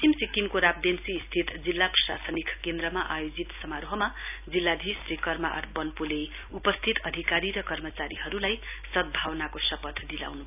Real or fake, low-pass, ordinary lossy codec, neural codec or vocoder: real; 3.6 kHz; none; none